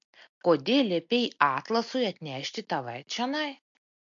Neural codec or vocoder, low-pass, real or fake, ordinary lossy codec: none; 7.2 kHz; real; MP3, 48 kbps